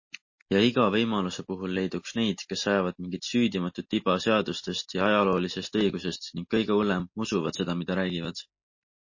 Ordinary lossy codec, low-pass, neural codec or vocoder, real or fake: MP3, 32 kbps; 7.2 kHz; none; real